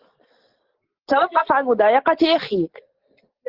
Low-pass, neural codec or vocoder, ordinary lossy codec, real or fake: 5.4 kHz; none; Opus, 16 kbps; real